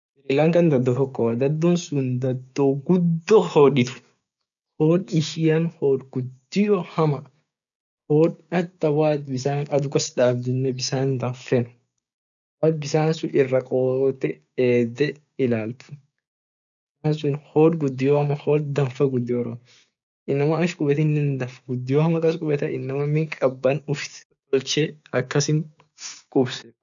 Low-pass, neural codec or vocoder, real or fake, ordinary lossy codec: 7.2 kHz; codec, 16 kHz, 6 kbps, DAC; fake; none